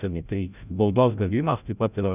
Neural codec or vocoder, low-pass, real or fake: codec, 16 kHz, 0.5 kbps, FreqCodec, larger model; 3.6 kHz; fake